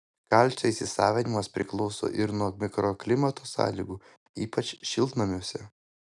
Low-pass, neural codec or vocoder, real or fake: 10.8 kHz; none; real